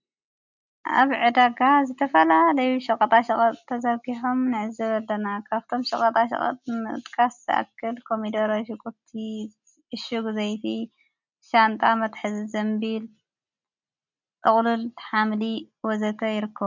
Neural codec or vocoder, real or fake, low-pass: none; real; 7.2 kHz